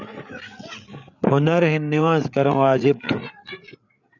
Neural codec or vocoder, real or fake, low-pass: codec, 16 kHz, 8 kbps, FreqCodec, larger model; fake; 7.2 kHz